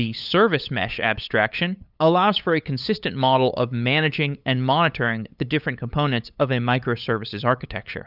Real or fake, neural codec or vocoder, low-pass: fake; codec, 16 kHz, 8 kbps, FunCodec, trained on LibriTTS, 25 frames a second; 5.4 kHz